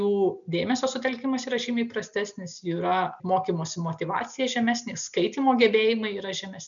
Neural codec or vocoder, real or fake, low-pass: none; real; 7.2 kHz